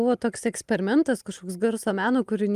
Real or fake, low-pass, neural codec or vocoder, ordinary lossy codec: real; 14.4 kHz; none; Opus, 32 kbps